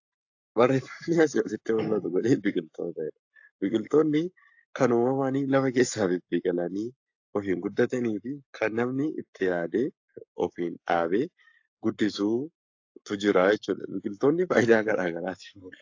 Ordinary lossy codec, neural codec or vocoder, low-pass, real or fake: AAC, 48 kbps; codec, 44.1 kHz, 7.8 kbps, Pupu-Codec; 7.2 kHz; fake